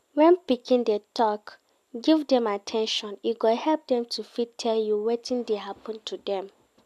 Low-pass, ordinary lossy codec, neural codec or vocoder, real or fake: 14.4 kHz; AAC, 96 kbps; none; real